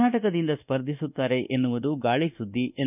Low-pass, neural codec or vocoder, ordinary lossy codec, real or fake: 3.6 kHz; codec, 24 kHz, 1.2 kbps, DualCodec; none; fake